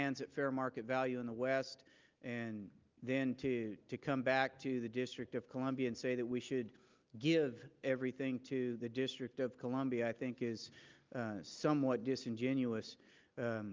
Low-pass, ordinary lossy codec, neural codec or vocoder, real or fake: 7.2 kHz; Opus, 24 kbps; none; real